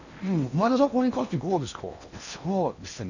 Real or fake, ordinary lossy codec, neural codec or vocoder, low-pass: fake; none; codec, 16 kHz in and 24 kHz out, 0.8 kbps, FocalCodec, streaming, 65536 codes; 7.2 kHz